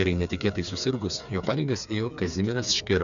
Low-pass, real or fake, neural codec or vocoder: 7.2 kHz; fake; codec, 16 kHz, 4 kbps, FreqCodec, smaller model